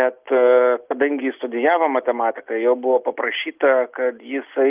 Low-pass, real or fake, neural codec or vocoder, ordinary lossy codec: 3.6 kHz; real; none; Opus, 24 kbps